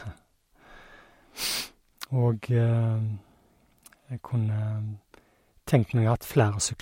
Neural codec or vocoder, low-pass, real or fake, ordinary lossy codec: none; 19.8 kHz; real; MP3, 64 kbps